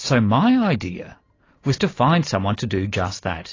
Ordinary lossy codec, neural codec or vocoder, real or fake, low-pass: AAC, 32 kbps; none; real; 7.2 kHz